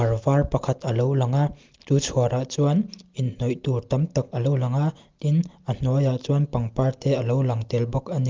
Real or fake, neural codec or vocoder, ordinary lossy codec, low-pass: real; none; Opus, 32 kbps; 7.2 kHz